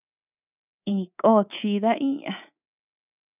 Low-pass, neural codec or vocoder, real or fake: 3.6 kHz; codec, 24 kHz, 3.1 kbps, DualCodec; fake